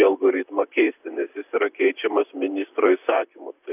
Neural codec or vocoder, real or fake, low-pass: vocoder, 44.1 kHz, 128 mel bands, Pupu-Vocoder; fake; 3.6 kHz